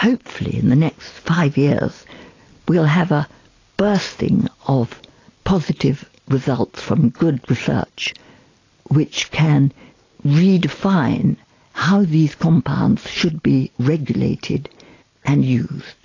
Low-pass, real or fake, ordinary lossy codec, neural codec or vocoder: 7.2 kHz; real; AAC, 32 kbps; none